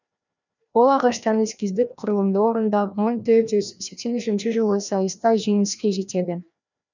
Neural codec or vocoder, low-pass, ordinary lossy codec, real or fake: codec, 16 kHz, 1 kbps, FreqCodec, larger model; 7.2 kHz; none; fake